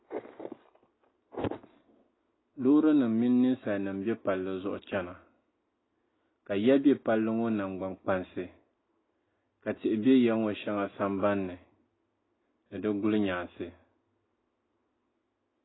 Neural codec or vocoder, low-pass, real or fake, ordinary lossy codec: none; 7.2 kHz; real; AAC, 16 kbps